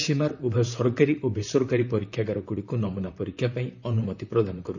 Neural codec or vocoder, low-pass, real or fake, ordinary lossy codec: vocoder, 44.1 kHz, 128 mel bands, Pupu-Vocoder; 7.2 kHz; fake; none